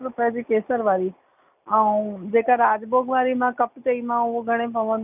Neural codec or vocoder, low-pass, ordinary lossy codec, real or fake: none; 3.6 kHz; none; real